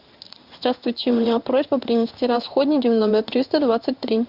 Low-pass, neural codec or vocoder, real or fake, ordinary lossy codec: 5.4 kHz; codec, 16 kHz in and 24 kHz out, 1 kbps, XY-Tokenizer; fake; Opus, 64 kbps